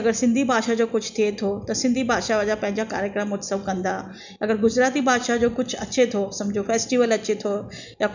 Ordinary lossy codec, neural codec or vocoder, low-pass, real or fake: none; none; 7.2 kHz; real